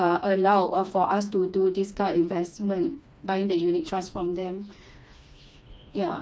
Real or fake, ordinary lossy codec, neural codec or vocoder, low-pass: fake; none; codec, 16 kHz, 2 kbps, FreqCodec, smaller model; none